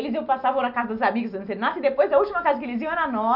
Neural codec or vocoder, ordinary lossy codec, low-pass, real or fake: none; none; 5.4 kHz; real